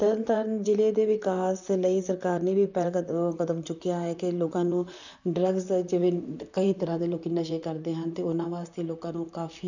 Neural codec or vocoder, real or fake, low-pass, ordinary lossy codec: vocoder, 44.1 kHz, 128 mel bands, Pupu-Vocoder; fake; 7.2 kHz; none